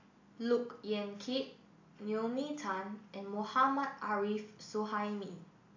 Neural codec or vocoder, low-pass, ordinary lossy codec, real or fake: none; 7.2 kHz; AAC, 48 kbps; real